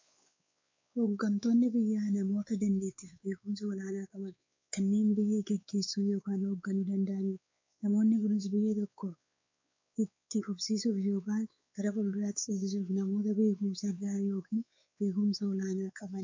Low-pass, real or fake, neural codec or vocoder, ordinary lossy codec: 7.2 kHz; fake; codec, 16 kHz, 4 kbps, X-Codec, WavLM features, trained on Multilingual LibriSpeech; MP3, 64 kbps